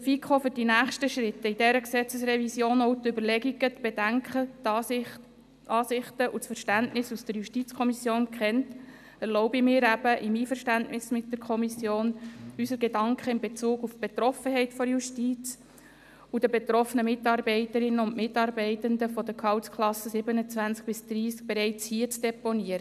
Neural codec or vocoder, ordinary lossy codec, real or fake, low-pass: none; none; real; 14.4 kHz